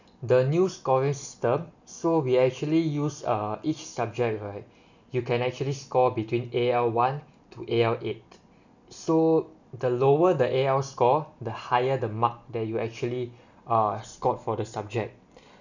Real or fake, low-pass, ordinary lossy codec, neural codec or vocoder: real; 7.2 kHz; none; none